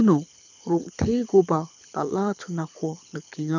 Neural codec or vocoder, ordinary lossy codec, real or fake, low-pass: codec, 24 kHz, 6 kbps, HILCodec; none; fake; 7.2 kHz